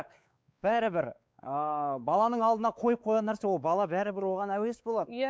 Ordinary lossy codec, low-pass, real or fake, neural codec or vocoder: none; none; fake; codec, 16 kHz, 4 kbps, X-Codec, WavLM features, trained on Multilingual LibriSpeech